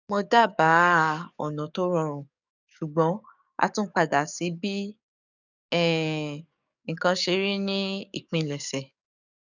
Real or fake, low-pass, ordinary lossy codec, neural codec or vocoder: fake; 7.2 kHz; none; codec, 44.1 kHz, 7.8 kbps, DAC